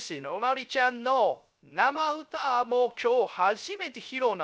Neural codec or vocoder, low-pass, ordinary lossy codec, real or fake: codec, 16 kHz, 0.3 kbps, FocalCodec; none; none; fake